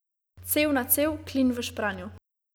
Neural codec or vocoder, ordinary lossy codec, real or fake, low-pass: none; none; real; none